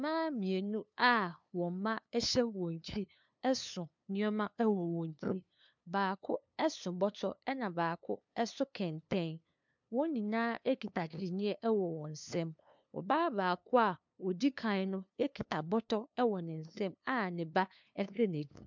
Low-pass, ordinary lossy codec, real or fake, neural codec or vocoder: 7.2 kHz; MP3, 64 kbps; fake; codec, 16 kHz, 2 kbps, FunCodec, trained on LibriTTS, 25 frames a second